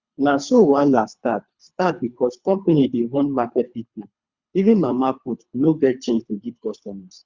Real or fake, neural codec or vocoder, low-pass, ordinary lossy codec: fake; codec, 24 kHz, 3 kbps, HILCodec; 7.2 kHz; Opus, 64 kbps